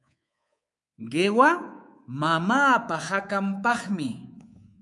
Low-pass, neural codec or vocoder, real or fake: 10.8 kHz; codec, 24 kHz, 3.1 kbps, DualCodec; fake